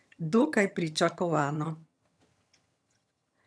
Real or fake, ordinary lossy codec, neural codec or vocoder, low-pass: fake; none; vocoder, 22.05 kHz, 80 mel bands, HiFi-GAN; none